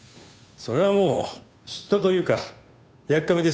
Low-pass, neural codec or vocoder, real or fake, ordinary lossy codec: none; codec, 16 kHz, 2 kbps, FunCodec, trained on Chinese and English, 25 frames a second; fake; none